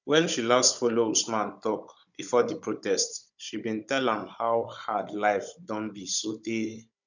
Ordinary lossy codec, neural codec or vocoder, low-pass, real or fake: none; codec, 16 kHz, 16 kbps, FunCodec, trained on Chinese and English, 50 frames a second; 7.2 kHz; fake